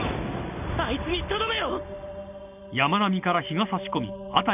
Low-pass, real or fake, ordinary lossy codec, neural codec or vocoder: 3.6 kHz; real; none; none